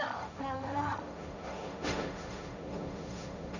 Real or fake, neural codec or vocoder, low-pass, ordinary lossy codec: fake; codec, 16 kHz, 1.1 kbps, Voila-Tokenizer; 7.2 kHz; none